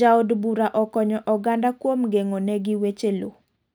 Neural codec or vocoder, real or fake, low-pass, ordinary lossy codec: none; real; none; none